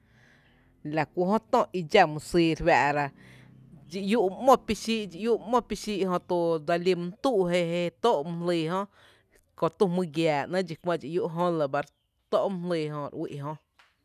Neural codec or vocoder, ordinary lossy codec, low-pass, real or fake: none; none; 14.4 kHz; real